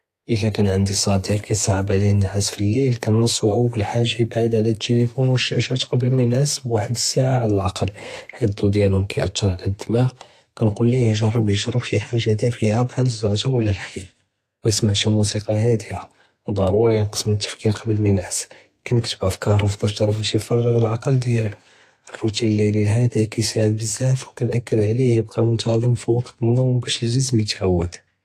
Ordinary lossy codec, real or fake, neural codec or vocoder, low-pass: AAC, 64 kbps; fake; codec, 32 kHz, 1.9 kbps, SNAC; 14.4 kHz